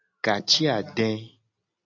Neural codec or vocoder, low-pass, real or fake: none; 7.2 kHz; real